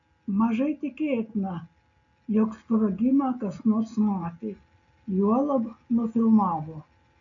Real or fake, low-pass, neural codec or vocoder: real; 7.2 kHz; none